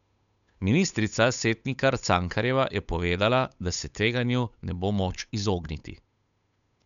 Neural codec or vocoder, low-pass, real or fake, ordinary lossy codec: codec, 16 kHz, 8 kbps, FunCodec, trained on Chinese and English, 25 frames a second; 7.2 kHz; fake; none